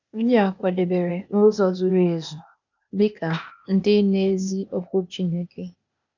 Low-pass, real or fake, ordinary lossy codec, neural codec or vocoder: 7.2 kHz; fake; none; codec, 16 kHz, 0.8 kbps, ZipCodec